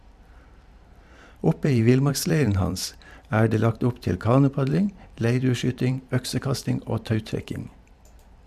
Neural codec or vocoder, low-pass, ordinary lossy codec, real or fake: vocoder, 44.1 kHz, 128 mel bands every 512 samples, BigVGAN v2; 14.4 kHz; MP3, 96 kbps; fake